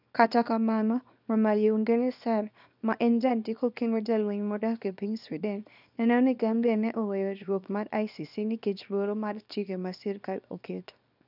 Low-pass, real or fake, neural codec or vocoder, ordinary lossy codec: 5.4 kHz; fake; codec, 24 kHz, 0.9 kbps, WavTokenizer, small release; none